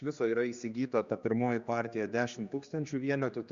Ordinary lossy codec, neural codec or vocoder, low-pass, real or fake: Opus, 64 kbps; codec, 16 kHz, 2 kbps, X-Codec, HuBERT features, trained on general audio; 7.2 kHz; fake